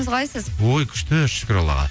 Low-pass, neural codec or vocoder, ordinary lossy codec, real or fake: none; none; none; real